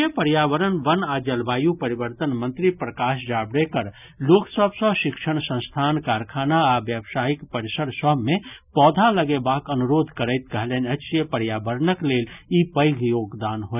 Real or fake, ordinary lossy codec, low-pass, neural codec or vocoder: real; none; 3.6 kHz; none